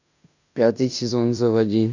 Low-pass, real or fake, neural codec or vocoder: 7.2 kHz; fake; codec, 16 kHz in and 24 kHz out, 0.9 kbps, LongCat-Audio-Codec, fine tuned four codebook decoder